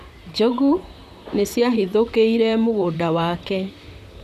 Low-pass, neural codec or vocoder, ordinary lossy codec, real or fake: 14.4 kHz; vocoder, 44.1 kHz, 128 mel bands, Pupu-Vocoder; none; fake